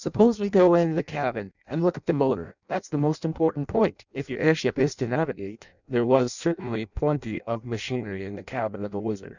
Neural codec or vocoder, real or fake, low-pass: codec, 16 kHz in and 24 kHz out, 0.6 kbps, FireRedTTS-2 codec; fake; 7.2 kHz